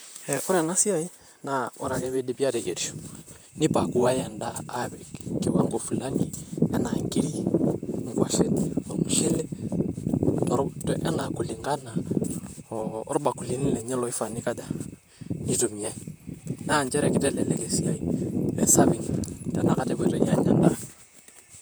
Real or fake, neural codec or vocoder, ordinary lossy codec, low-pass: fake; vocoder, 44.1 kHz, 128 mel bands, Pupu-Vocoder; none; none